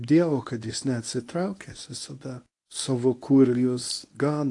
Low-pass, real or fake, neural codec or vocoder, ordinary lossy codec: 10.8 kHz; fake; codec, 24 kHz, 0.9 kbps, WavTokenizer, medium speech release version 1; AAC, 48 kbps